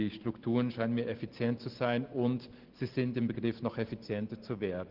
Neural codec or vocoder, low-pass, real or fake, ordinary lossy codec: none; 5.4 kHz; real; Opus, 16 kbps